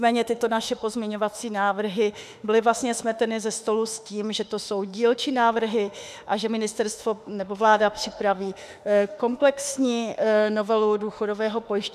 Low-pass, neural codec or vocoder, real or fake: 14.4 kHz; autoencoder, 48 kHz, 32 numbers a frame, DAC-VAE, trained on Japanese speech; fake